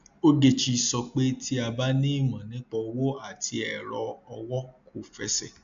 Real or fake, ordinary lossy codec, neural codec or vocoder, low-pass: real; AAC, 64 kbps; none; 7.2 kHz